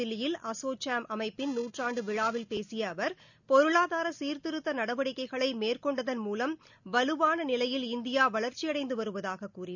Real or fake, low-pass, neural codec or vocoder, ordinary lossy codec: real; 7.2 kHz; none; none